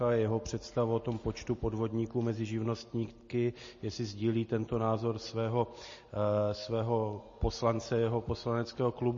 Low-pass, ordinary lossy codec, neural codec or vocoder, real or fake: 7.2 kHz; MP3, 32 kbps; none; real